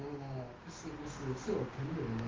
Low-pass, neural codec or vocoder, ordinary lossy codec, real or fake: 7.2 kHz; codec, 16 kHz, 6 kbps, DAC; Opus, 16 kbps; fake